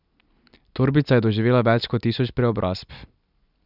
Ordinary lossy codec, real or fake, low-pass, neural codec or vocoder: none; real; 5.4 kHz; none